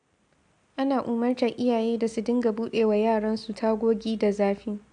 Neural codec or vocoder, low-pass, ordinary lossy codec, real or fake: none; 9.9 kHz; AAC, 64 kbps; real